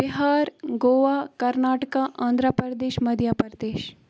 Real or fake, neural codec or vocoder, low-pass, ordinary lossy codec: real; none; none; none